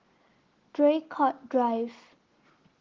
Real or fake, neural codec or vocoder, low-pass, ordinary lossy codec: real; none; 7.2 kHz; Opus, 16 kbps